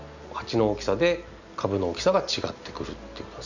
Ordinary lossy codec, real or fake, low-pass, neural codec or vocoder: none; real; 7.2 kHz; none